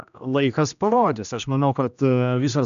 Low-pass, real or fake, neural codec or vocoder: 7.2 kHz; fake; codec, 16 kHz, 1 kbps, X-Codec, HuBERT features, trained on general audio